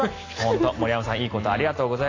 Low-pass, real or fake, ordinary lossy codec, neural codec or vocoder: 7.2 kHz; real; AAC, 32 kbps; none